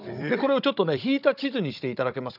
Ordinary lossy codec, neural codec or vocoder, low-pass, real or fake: none; codec, 16 kHz, 16 kbps, FunCodec, trained on Chinese and English, 50 frames a second; 5.4 kHz; fake